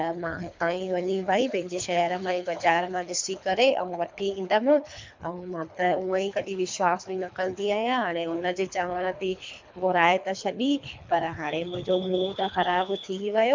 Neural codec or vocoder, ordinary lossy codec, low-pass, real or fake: codec, 24 kHz, 3 kbps, HILCodec; MP3, 64 kbps; 7.2 kHz; fake